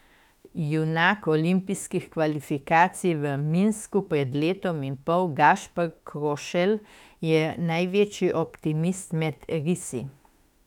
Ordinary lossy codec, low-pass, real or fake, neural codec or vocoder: none; 19.8 kHz; fake; autoencoder, 48 kHz, 32 numbers a frame, DAC-VAE, trained on Japanese speech